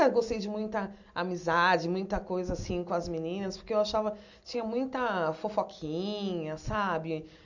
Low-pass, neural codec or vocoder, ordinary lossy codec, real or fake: 7.2 kHz; none; none; real